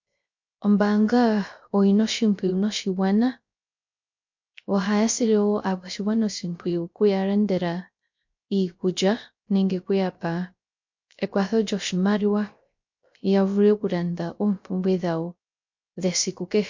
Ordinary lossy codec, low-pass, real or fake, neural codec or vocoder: MP3, 48 kbps; 7.2 kHz; fake; codec, 16 kHz, 0.3 kbps, FocalCodec